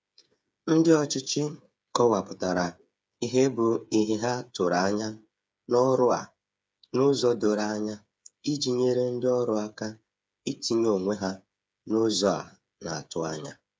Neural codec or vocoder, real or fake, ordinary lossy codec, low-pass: codec, 16 kHz, 8 kbps, FreqCodec, smaller model; fake; none; none